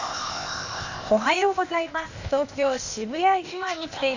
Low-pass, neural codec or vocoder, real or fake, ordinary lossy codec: 7.2 kHz; codec, 16 kHz, 0.8 kbps, ZipCodec; fake; none